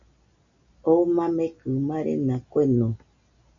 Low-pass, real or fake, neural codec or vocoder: 7.2 kHz; real; none